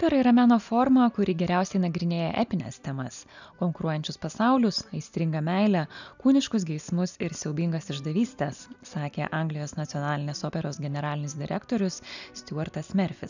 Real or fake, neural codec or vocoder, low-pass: real; none; 7.2 kHz